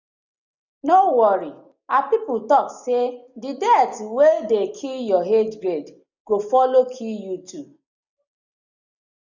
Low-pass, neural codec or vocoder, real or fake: 7.2 kHz; none; real